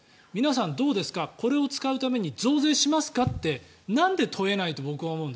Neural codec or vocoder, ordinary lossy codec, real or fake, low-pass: none; none; real; none